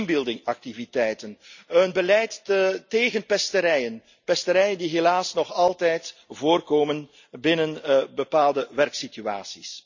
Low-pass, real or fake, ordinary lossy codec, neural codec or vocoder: 7.2 kHz; real; none; none